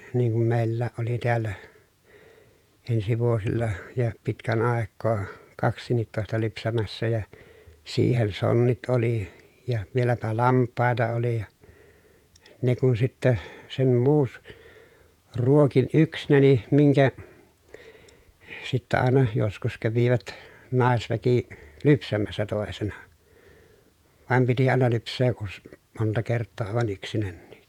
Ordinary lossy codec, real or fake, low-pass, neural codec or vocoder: none; fake; 19.8 kHz; vocoder, 48 kHz, 128 mel bands, Vocos